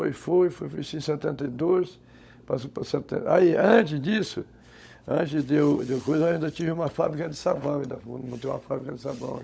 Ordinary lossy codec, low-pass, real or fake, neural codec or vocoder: none; none; fake; codec, 16 kHz, 16 kbps, FunCodec, trained on LibriTTS, 50 frames a second